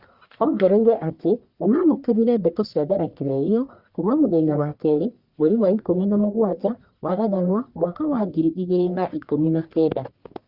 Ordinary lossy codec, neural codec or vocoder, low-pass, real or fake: Opus, 64 kbps; codec, 44.1 kHz, 1.7 kbps, Pupu-Codec; 5.4 kHz; fake